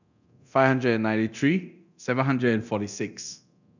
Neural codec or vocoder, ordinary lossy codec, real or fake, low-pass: codec, 24 kHz, 0.9 kbps, DualCodec; none; fake; 7.2 kHz